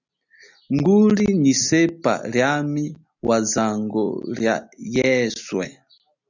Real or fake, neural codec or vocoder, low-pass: real; none; 7.2 kHz